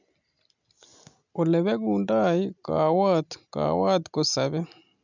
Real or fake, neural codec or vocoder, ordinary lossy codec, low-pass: real; none; none; 7.2 kHz